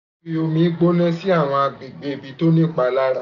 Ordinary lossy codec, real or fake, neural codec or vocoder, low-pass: none; real; none; 7.2 kHz